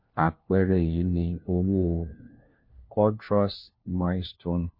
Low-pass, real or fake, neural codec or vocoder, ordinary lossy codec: 5.4 kHz; fake; codec, 16 kHz, 1 kbps, FunCodec, trained on LibriTTS, 50 frames a second; MP3, 32 kbps